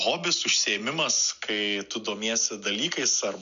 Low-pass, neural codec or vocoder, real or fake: 7.2 kHz; none; real